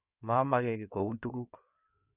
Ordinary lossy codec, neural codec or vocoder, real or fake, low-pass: none; codec, 16 kHz in and 24 kHz out, 2.2 kbps, FireRedTTS-2 codec; fake; 3.6 kHz